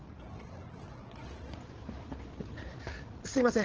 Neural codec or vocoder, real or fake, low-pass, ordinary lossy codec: codec, 16 kHz, 8 kbps, FreqCodec, larger model; fake; 7.2 kHz; Opus, 16 kbps